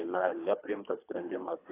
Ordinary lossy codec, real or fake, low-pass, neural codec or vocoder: AAC, 24 kbps; fake; 3.6 kHz; codec, 24 kHz, 3 kbps, HILCodec